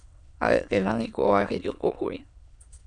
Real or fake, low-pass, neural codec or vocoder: fake; 9.9 kHz; autoencoder, 22.05 kHz, a latent of 192 numbers a frame, VITS, trained on many speakers